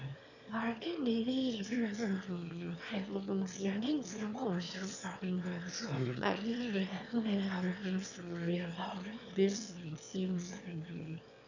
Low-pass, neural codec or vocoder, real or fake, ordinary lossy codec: 7.2 kHz; autoencoder, 22.05 kHz, a latent of 192 numbers a frame, VITS, trained on one speaker; fake; none